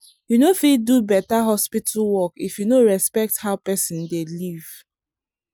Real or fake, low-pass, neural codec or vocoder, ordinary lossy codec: real; none; none; none